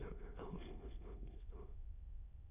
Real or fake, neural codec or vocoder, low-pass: fake; autoencoder, 22.05 kHz, a latent of 192 numbers a frame, VITS, trained on many speakers; 3.6 kHz